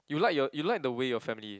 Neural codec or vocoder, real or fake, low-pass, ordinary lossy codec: none; real; none; none